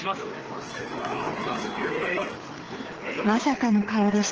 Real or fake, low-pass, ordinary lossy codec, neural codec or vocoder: fake; 7.2 kHz; Opus, 16 kbps; codec, 16 kHz, 4 kbps, FreqCodec, larger model